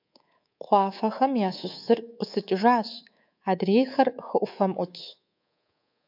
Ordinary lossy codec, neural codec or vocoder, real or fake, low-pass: MP3, 48 kbps; codec, 24 kHz, 3.1 kbps, DualCodec; fake; 5.4 kHz